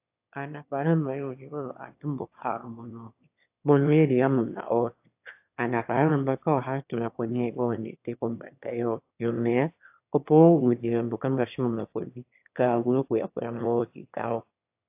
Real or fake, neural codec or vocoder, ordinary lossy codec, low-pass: fake; autoencoder, 22.05 kHz, a latent of 192 numbers a frame, VITS, trained on one speaker; AAC, 32 kbps; 3.6 kHz